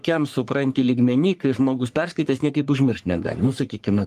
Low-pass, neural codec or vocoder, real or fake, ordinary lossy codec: 14.4 kHz; codec, 44.1 kHz, 3.4 kbps, Pupu-Codec; fake; Opus, 32 kbps